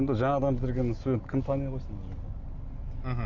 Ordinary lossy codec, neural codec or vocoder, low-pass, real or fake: Opus, 64 kbps; none; 7.2 kHz; real